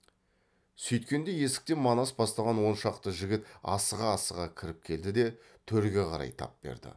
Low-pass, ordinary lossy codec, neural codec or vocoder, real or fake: none; none; none; real